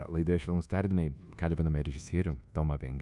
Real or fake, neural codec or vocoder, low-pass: fake; codec, 24 kHz, 1.2 kbps, DualCodec; 10.8 kHz